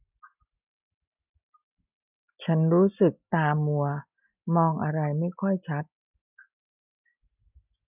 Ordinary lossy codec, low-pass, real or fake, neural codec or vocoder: none; 3.6 kHz; real; none